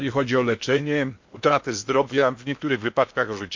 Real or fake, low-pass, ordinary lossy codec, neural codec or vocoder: fake; 7.2 kHz; MP3, 48 kbps; codec, 16 kHz in and 24 kHz out, 0.8 kbps, FocalCodec, streaming, 65536 codes